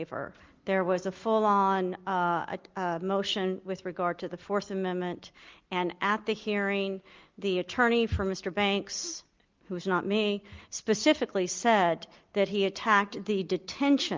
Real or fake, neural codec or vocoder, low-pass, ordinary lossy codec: real; none; 7.2 kHz; Opus, 32 kbps